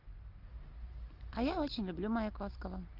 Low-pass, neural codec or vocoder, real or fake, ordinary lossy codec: 5.4 kHz; none; real; Opus, 24 kbps